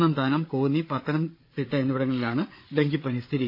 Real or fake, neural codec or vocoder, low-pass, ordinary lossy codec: fake; codec, 16 kHz, 8 kbps, FreqCodec, larger model; 5.4 kHz; MP3, 32 kbps